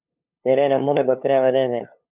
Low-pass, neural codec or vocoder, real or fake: 3.6 kHz; codec, 16 kHz, 2 kbps, FunCodec, trained on LibriTTS, 25 frames a second; fake